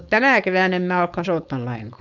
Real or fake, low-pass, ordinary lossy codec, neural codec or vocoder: fake; 7.2 kHz; none; codec, 16 kHz, 4.8 kbps, FACodec